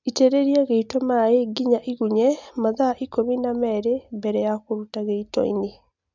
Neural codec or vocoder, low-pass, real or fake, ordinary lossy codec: none; 7.2 kHz; real; none